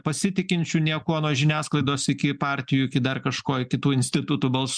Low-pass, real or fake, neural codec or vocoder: 9.9 kHz; real; none